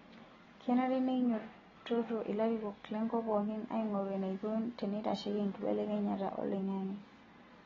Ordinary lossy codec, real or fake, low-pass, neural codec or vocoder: AAC, 24 kbps; real; 7.2 kHz; none